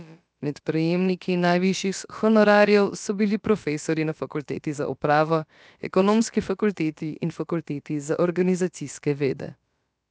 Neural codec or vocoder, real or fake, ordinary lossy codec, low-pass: codec, 16 kHz, about 1 kbps, DyCAST, with the encoder's durations; fake; none; none